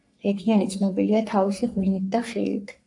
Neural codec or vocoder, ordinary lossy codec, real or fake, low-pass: codec, 44.1 kHz, 3.4 kbps, Pupu-Codec; AAC, 64 kbps; fake; 10.8 kHz